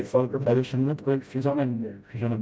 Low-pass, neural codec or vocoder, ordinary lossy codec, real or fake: none; codec, 16 kHz, 0.5 kbps, FreqCodec, smaller model; none; fake